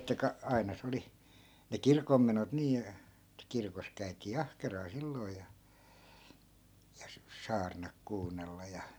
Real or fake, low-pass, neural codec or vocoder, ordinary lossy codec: real; none; none; none